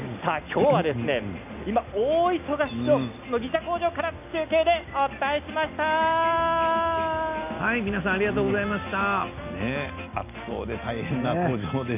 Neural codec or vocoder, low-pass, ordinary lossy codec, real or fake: none; 3.6 kHz; none; real